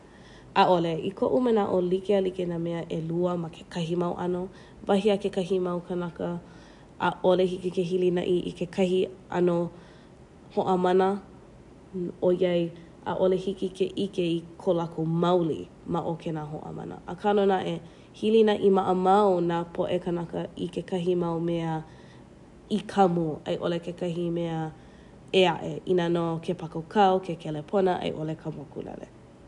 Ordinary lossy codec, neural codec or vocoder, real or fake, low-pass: none; none; real; 10.8 kHz